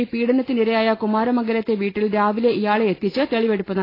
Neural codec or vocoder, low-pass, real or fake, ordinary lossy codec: none; 5.4 kHz; real; AAC, 24 kbps